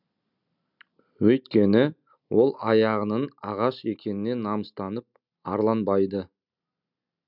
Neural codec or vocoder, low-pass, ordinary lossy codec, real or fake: none; 5.4 kHz; none; real